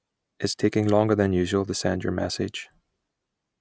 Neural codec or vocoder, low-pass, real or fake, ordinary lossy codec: none; none; real; none